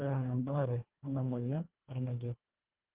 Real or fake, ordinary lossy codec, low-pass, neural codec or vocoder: fake; Opus, 16 kbps; 3.6 kHz; codec, 24 kHz, 1.5 kbps, HILCodec